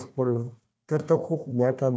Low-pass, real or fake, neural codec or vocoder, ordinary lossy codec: none; fake; codec, 16 kHz, 1 kbps, FunCodec, trained on Chinese and English, 50 frames a second; none